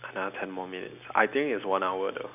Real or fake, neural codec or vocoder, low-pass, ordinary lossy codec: real; none; 3.6 kHz; none